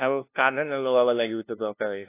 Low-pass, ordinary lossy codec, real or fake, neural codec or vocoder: 3.6 kHz; AAC, 24 kbps; fake; codec, 16 kHz, 0.5 kbps, FunCodec, trained on LibriTTS, 25 frames a second